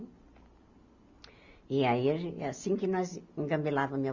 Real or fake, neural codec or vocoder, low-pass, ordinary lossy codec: real; none; 7.2 kHz; Opus, 64 kbps